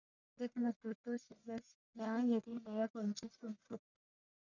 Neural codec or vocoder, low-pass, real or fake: codec, 44.1 kHz, 1.7 kbps, Pupu-Codec; 7.2 kHz; fake